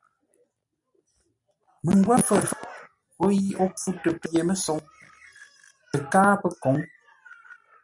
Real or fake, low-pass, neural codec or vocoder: real; 10.8 kHz; none